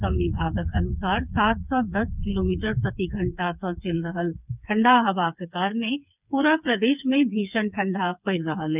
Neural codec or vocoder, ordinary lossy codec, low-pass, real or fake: codec, 16 kHz, 4 kbps, FreqCodec, smaller model; none; 3.6 kHz; fake